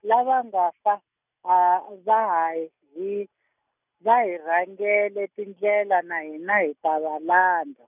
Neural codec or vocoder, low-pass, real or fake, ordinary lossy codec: none; 3.6 kHz; real; none